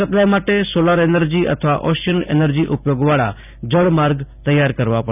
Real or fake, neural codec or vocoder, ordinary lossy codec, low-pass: real; none; none; 3.6 kHz